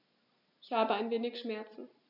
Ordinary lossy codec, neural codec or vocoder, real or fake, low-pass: none; none; real; 5.4 kHz